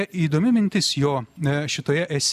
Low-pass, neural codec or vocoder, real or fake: 14.4 kHz; none; real